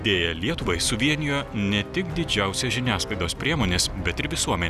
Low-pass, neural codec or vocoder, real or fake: 14.4 kHz; none; real